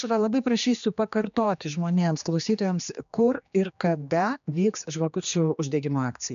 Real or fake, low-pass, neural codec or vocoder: fake; 7.2 kHz; codec, 16 kHz, 2 kbps, X-Codec, HuBERT features, trained on general audio